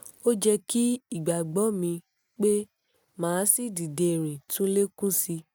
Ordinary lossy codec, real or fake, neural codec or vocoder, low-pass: none; real; none; none